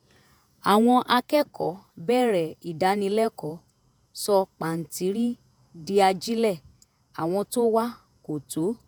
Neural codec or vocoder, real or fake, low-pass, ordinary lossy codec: vocoder, 48 kHz, 128 mel bands, Vocos; fake; none; none